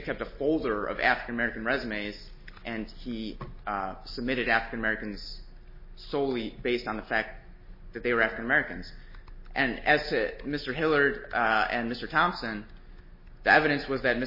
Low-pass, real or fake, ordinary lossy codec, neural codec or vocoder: 5.4 kHz; real; MP3, 24 kbps; none